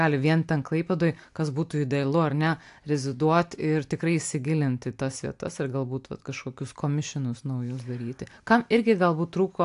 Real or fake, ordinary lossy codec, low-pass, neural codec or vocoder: real; AAC, 64 kbps; 10.8 kHz; none